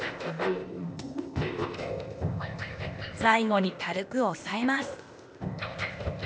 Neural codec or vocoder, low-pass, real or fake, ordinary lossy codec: codec, 16 kHz, 0.8 kbps, ZipCodec; none; fake; none